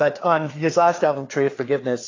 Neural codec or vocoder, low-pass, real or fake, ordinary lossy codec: codec, 16 kHz, 4 kbps, FreqCodec, larger model; 7.2 kHz; fake; AAC, 32 kbps